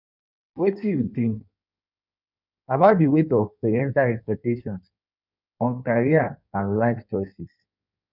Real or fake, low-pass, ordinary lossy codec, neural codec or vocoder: fake; 5.4 kHz; none; codec, 16 kHz in and 24 kHz out, 1.1 kbps, FireRedTTS-2 codec